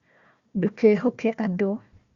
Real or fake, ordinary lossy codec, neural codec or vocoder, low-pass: fake; Opus, 64 kbps; codec, 16 kHz, 1 kbps, FunCodec, trained on Chinese and English, 50 frames a second; 7.2 kHz